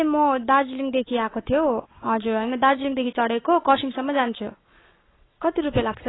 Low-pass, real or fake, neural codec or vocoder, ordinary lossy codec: 7.2 kHz; fake; codec, 44.1 kHz, 7.8 kbps, Pupu-Codec; AAC, 16 kbps